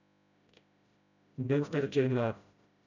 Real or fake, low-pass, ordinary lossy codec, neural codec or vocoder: fake; 7.2 kHz; none; codec, 16 kHz, 0.5 kbps, FreqCodec, smaller model